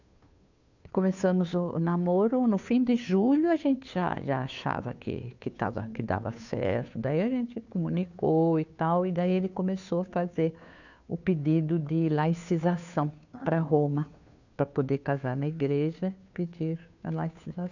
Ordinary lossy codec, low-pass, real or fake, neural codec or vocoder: none; 7.2 kHz; fake; codec, 16 kHz, 2 kbps, FunCodec, trained on Chinese and English, 25 frames a second